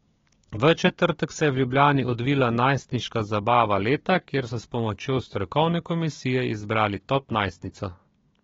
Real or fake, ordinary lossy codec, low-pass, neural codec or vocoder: fake; AAC, 24 kbps; 7.2 kHz; codec, 16 kHz, 6 kbps, DAC